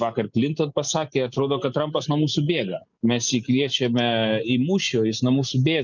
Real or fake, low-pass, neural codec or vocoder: real; 7.2 kHz; none